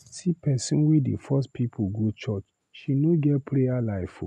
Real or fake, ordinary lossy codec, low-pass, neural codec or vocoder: real; none; none; none